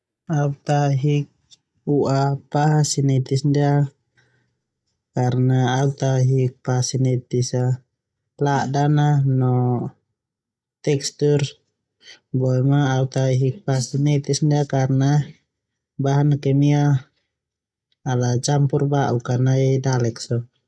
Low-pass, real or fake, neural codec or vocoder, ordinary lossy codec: none; real; none; none